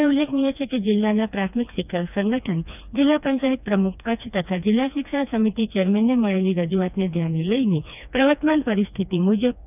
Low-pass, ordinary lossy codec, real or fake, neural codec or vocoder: 3.6 kHz; none; fake; codec, 16 kHz, 2 kbps, FreqCodec, smaller model